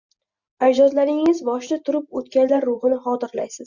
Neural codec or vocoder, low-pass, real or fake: none; 7.2 kHz; real